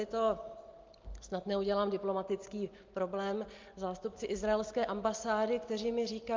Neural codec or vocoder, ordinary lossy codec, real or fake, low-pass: none; Opus, 24 kbps; real; 7.2 kHz